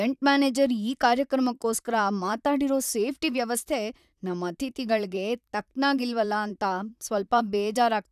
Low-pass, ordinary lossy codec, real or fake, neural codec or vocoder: 14.4 kHz; none; fake; vocoder, 44.1 kHz, 128 mel bands, Pupu-Vocoder